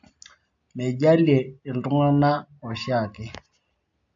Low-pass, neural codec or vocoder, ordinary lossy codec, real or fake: 7.2 kHz; none; AAC, 64 kbps; real